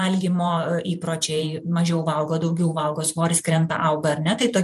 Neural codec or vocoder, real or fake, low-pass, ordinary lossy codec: vocoder, 44.1 kHz, 128 mel bands every 512 samples, BigVGAN v2; fake; 14.4 kHz; MP3, 64 kbps